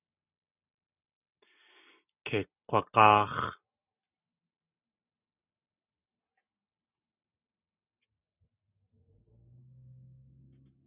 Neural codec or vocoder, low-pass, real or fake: none; 3.6 kHz; real